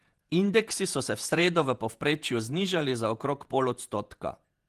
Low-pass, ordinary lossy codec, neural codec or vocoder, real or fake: 14.4 kHz; Opus, 24 kbps; vocoder, 48 kHz, 128 mel bands, Vocos; fake